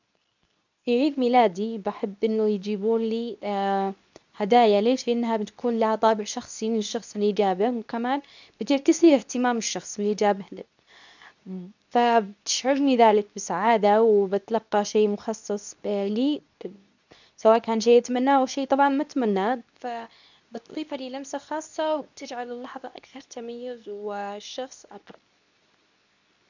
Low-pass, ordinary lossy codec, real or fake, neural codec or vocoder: 7.2 kHz; none; fake; codec, 24 kHz, 0.9 kbps, WavTokenizer, medium speech release version 2